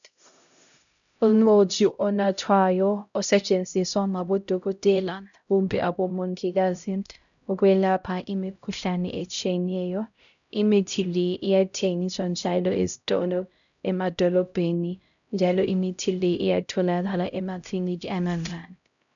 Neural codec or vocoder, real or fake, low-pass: codec, 16 kHz, 0.5 kbps, X-Codec, HuBERT features, trained on LibriSpeech; fake; 7.2 kHz